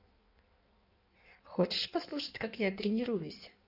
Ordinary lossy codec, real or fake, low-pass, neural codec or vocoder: none; fake; 5.4 kHz; codec, 16 kHz in and 24 kHz out, 1.1 kbps, FireRedTTS-2 codec